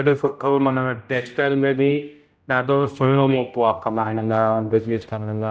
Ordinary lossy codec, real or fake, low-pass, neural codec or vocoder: none; fake; none; codec, 16 kHz, 0.5 kbps, X-Codec, HuBERT features, trained on general audio